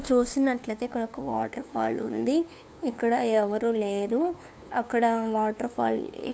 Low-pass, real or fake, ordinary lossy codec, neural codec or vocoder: none; fake; none; codec, 16 kHz, 2 kbps, FunCodec, trained on LibriTTS, 25 frames a second